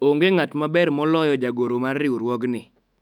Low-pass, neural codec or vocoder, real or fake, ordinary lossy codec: 19.8 kHz; autoencoder, 48 kHz, 128 numbers a frame, DAC-VAE, trained on Japanese speech; fake; none